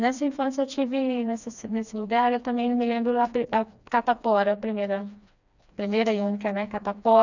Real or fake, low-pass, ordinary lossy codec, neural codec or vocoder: fake; 7.2 kHz; none; codec, 16 kHz, 2 kbps, FreqCodec, smaller model